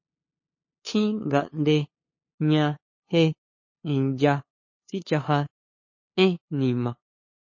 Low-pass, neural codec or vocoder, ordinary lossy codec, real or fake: 7.2 kHz; codec, 16 kHz, 2 kbps, FunCodec, trained on LibriTTS, 25 frames a second; MP3, 32 kbps; fake